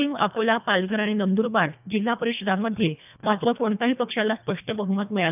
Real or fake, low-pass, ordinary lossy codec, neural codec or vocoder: fake; 3.6 kHz; none; codec, 24 kHz, 1.5 kbps, HILCodec